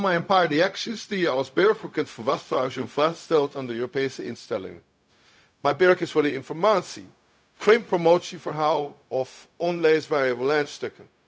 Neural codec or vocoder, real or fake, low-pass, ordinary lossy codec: codec, 16 kHz, 0.4 kbps, LongCat-Audio-Codec; fake; none; none